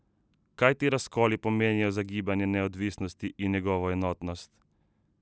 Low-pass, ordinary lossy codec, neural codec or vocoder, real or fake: none; none; none; real